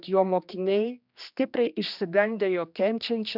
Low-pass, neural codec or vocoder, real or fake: 5.4 kHz; codec, 16 kHz, 2 kbps, X-Codec, HuBERT features, trained on general audio; fake